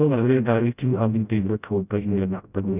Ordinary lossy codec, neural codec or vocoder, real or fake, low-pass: none; codec, 16 kHz, 0.5 kbps, FreqCodec, smaller model; fake; 3.6 kHz